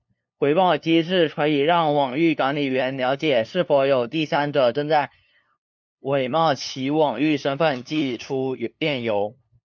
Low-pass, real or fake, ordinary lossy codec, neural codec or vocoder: 7.2 kHz; fake; MP3, 64 kbps; codec, 16 kHz, 2 kbps, FunCodec, trained on LibriTTS, 25 frames a second